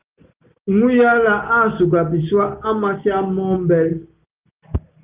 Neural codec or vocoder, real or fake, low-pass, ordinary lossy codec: none; real; 3.6 kHz; Opus, 32 kbps